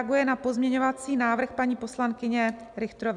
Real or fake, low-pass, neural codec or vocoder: real; 10.8 kHz; none